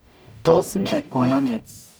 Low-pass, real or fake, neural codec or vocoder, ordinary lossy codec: none; fake; codec, 44.1 kHz, 0.9 kbps, DAC; none